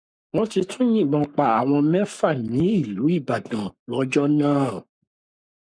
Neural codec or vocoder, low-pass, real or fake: codec, 44.1 kHz, 7.8 kbps, Pupu-Codec; 9.9 kHz; fake